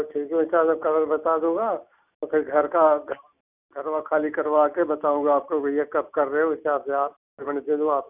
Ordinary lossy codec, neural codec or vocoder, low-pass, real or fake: none; none; 3.6 kHz; real